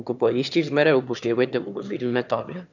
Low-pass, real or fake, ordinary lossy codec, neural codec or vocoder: 7.2 kHz; fake; none; autoencoder, 22.05 kHz, a latent of 192 numbers a frame, VITS, trained on one speaker